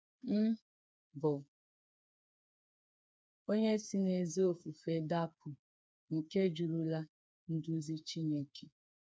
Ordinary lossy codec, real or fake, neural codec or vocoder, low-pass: none; fake; codec, 16 kHz, 4 kbps, FreqCodec, smaller model; none